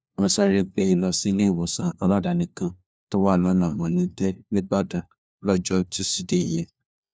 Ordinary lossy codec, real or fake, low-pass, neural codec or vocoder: none; fake; none; codec, 16 kHz, 1 kbps, FunCodec, trained on LibriTTS, 50 frames a second